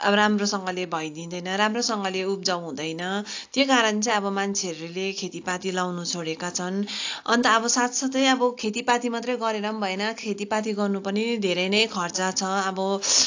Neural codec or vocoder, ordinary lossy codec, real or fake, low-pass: none; AAC, 48 kbps; real; 7.2 kHz